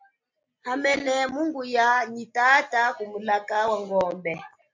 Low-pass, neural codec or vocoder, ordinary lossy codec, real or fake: 7.2 kHz; none; MP3, 48 kbps; real